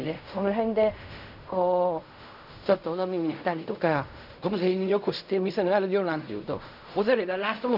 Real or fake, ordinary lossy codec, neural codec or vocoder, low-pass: fake; none; codec, 16 kHz in and 24 kHz out, 0.4 kbps, LongCat-Audio-Codec, fine tuned four codebook decoder; 5.4 kHz